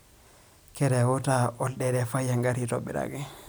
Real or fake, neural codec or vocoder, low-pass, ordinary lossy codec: real; none; none; none